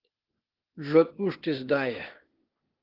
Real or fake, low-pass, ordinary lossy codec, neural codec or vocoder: fake; 5.4 kHz; Opus, 16 kbps; codec, 16 kHz, 0.8 kbps, ZipCodec